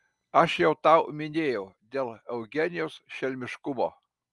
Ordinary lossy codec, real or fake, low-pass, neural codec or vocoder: Opus, 32 kbps; real; 10.8 kHz; none